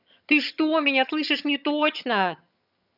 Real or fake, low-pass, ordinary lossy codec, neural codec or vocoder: fake; 5.4 kHz; none; vocoder, 22.05 kHz, 80 mel bands, HiFi-GAN